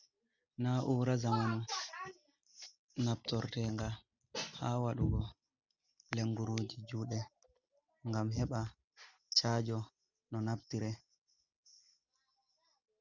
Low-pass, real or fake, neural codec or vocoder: 7.2 kHz; real; none